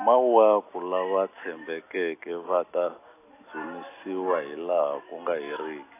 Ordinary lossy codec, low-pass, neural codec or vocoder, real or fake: AAC, 24 kbps; 3.6 kHz; none; real